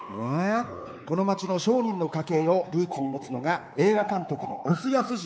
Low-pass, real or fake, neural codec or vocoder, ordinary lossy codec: none; fake; codec, 16 kHz, 4 kbps, X-Codec, WavLM features, trained on Multilingual LibriSpeech; none